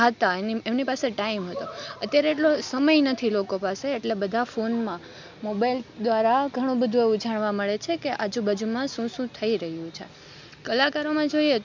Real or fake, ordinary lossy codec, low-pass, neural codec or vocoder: real; MP3, 64 kbps; 7.2 kHz; none